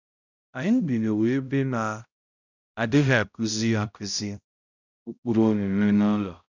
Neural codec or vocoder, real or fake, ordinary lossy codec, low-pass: codec, 16 kHz, 0.5 kbps, X-Codec, HuBERT features, trained on balanced general audio; fake; none; 7.2 kHz